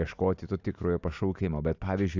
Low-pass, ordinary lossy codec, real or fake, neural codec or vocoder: 7.2 kHz; AAC, 48 kbps; real; none